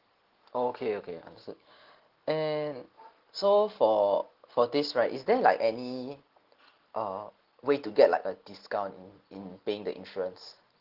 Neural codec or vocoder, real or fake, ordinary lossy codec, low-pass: none; real; Opus, 16 kbps; 5.4 kHz